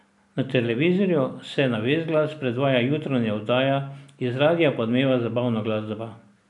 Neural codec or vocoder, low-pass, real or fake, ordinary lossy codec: none; 10.8 kHz; real; none